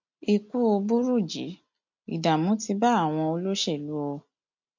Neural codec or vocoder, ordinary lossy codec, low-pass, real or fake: none; MP3, 48 kbps; 7.2 kHz; real